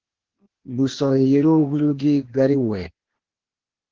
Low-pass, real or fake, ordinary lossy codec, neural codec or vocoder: 7.2 kHz; fake; Opus, 16 kbps; codec, 16 kHz, 0.8 kbps, ZipCodec